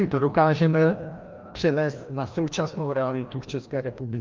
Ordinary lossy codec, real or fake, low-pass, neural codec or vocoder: Opus, 24 kbps; fake; 7.2 kHz; codec, 16 kHz, 1 kbps, FreqCodec, larger model